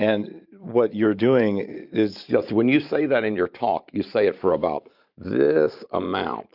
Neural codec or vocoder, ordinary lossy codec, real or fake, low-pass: vocoder, 44.1 kHz, 128 mel bands every 512 samples, BigVGAN v2; Opus, 64 kbps; fake; 5.4 kHz